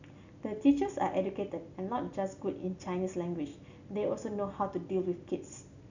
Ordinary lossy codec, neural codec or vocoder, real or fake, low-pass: none; none; real; 7.2 kHz